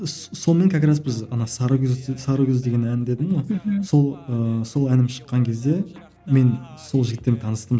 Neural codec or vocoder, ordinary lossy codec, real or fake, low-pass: none; none; real; none